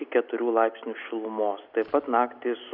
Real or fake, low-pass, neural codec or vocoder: real; 5.4 kHz; none